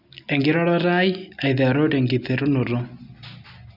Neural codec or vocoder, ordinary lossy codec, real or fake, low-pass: none; none; real; 5.4 kHz